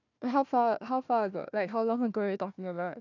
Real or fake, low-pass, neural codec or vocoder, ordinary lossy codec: fake; 7.2 kHz; codec, 16 kHz, 1 kbps, FunCodec, trained on Chinese and English, 50 frames a second; none